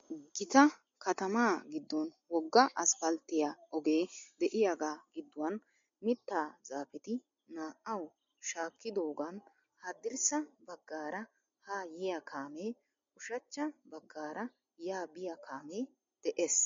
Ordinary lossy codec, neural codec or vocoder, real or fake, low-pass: MP3, 48 kbps; none; real; 7.2 kHz